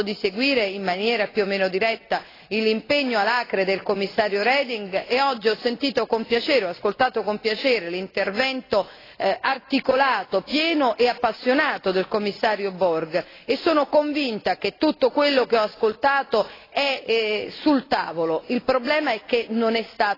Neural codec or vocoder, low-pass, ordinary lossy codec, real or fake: none; 5.4 kHz; AAC, 24 kbps; real